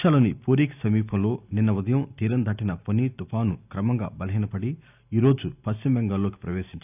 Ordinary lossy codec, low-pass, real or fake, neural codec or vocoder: none; 3.6 kHz; real; none